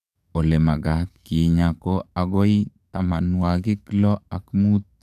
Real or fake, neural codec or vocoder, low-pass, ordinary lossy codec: fake; codec, 44.1 kHz, 7.8 kbps, DAC; 14.4 kHz; none